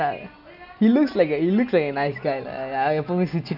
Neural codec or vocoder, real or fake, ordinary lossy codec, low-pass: none; real; none; 5.4 kHz